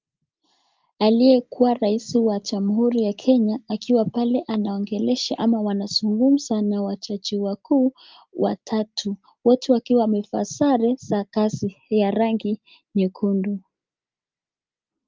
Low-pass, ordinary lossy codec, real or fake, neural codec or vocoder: 7.2 kHz; Opus, 24 kbps; real; none